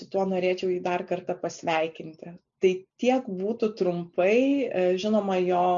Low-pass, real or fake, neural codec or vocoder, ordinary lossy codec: 7.2 kHz; real; none; MP3, 64 kbps